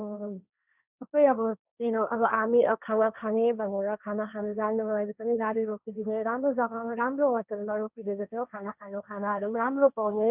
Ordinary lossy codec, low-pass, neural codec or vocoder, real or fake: none; 3.6 kHz; codec, 16 kHz, 1.1 kbps, Voila-Tokenizer; fake